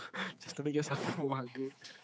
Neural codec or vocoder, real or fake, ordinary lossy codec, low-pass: codec, 16 kHz, 4 kbps, X-Codec, HuBERT features, trained on general audio; fake; none; none